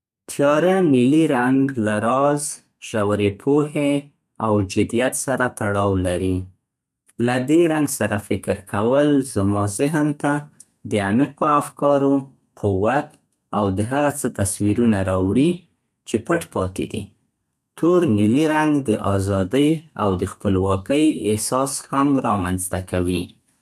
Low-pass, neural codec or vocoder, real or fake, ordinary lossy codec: 14.4 kHz; codec, 32 kHz, 1.9 kbps, SNAC; fake; none